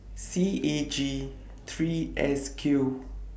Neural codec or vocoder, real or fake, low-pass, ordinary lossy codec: none; real; none; none